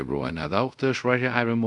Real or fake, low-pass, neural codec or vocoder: fake; 10.8 kHz; codec, 24 kHz, 0.5 kbps, DualCodec